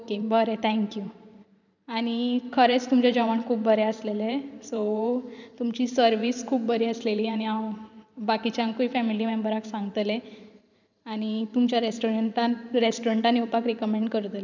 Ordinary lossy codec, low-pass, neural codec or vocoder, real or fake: none; 7.2 kHz; vocoder, 44.1 kHz, 128 mel bands every 512 samples, BigVGAN v2; fake